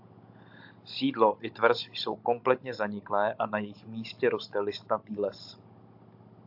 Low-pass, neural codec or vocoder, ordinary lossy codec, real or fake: 5.4 kHz; codec, 16 kHz, 16 kbps, FunCodec, trained on Chinese and English, 50 frames a second; AAC, 48 kbps; fake